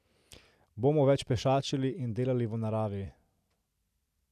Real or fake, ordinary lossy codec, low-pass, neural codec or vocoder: real; none; 14.4 kHz; none